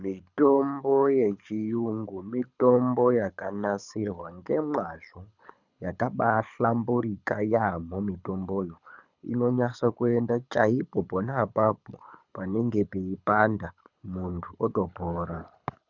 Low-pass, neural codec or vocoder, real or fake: 7.2 kHz; codec, 24 kHz, 6 kbps, HILCodec; fake